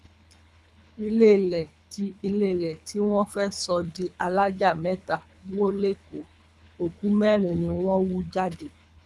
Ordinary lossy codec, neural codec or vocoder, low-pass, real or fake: none; codec, 24 kHz, 3 kbps, HILCodec; none; fake